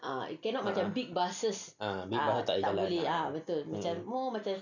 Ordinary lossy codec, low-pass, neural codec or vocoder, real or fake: none; 7.2 kHz; none; real